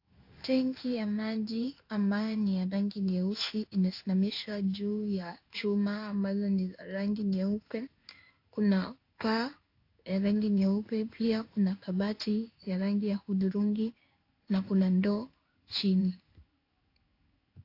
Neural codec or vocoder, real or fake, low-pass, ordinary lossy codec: codec, 16 kHz in and 24 kHz out, 1 kbps, XY-Tokenizer; fake; 5.4 kHz; AAC, 32 kbps